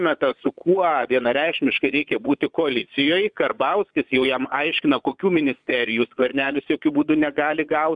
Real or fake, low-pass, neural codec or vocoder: fake; 9.9 kHz; vocoder, 22.05 kHz, 80 mel bands, Vocos